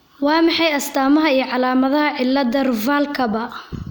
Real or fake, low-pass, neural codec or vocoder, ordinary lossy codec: real; none; none; none